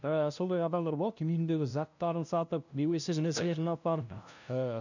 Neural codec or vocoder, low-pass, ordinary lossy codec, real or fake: codec, 16 kHz, 0.5 kbps, FunCodec, trained on LibriTTS, 25 frames a second; 7.2 kHz; none; fake